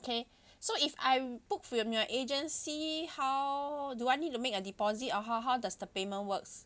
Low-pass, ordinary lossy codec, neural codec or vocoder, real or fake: none; none; none; real